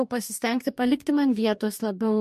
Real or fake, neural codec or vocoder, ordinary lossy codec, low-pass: fake; codec, 44.1 kHz, 2.6 kbps, DAC; MP3, 64 kbps; 14.4 kHz